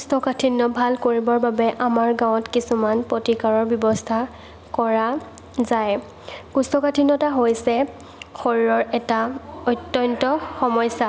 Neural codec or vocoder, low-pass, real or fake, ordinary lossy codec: none; none; real; none